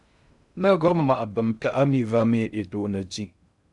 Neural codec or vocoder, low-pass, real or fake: codec, 16 kHz in and 24 kHz out, 0.6 kbps, FocalCodec, streaming, 2048 codes; 10.8 kHz; fake